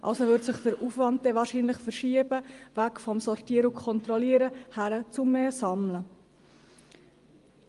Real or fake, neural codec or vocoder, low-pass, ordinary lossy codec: fake; vocoder, 24 kHz, 100 mel bands, Vocos; 10.8 kHz; Opus, 32 kbps